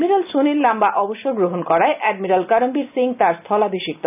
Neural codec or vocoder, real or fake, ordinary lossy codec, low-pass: none; real; none; 3.6 kHz